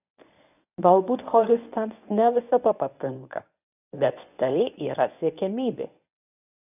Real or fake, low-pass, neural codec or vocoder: fake; 3.6 kHz; codec, 24 kHz, 0.9 kbps, WavTokenizer, medium speech release version 1